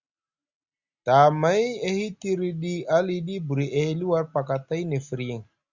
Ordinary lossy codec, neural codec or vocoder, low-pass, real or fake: Opus, 64 kbps; none; 7.2 kHz; real